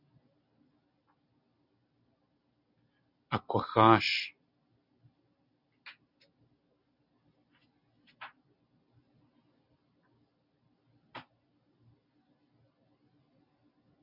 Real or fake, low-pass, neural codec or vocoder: real; 5.4 kHz; none